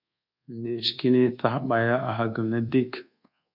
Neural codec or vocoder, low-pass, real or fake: codec, 24 kHz, 1.2 kbps, DualCodec; 5.4 kHz; fake